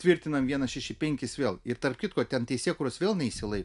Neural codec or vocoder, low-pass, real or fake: none; 10.8 kHz; real